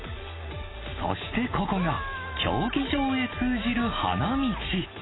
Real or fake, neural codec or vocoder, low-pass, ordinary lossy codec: real; none; 7.2 kHz; AAC, 16 kbps